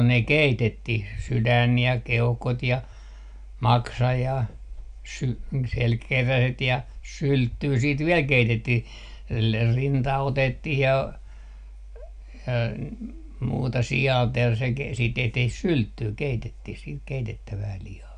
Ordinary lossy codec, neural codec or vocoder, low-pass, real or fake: none; none; 9.9 kHz; real